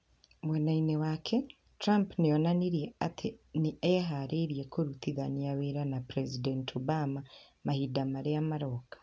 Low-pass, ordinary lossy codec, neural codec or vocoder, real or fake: none; none; none; real